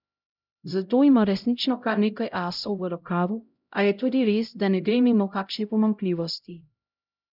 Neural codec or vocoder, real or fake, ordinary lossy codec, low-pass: codec, 16 kHz, 0.5 kbps, X-Codec, HuBERT features, trained on LibriSpeech; fake; none; 5.4 kHz